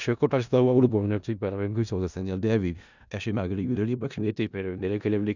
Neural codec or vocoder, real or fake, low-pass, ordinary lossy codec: codec, 16 kHz in and 24 kHz out, 0.4 kbps, LongCat-Audio-Codec, four codebook decoder; fake; 7.2 kHz; none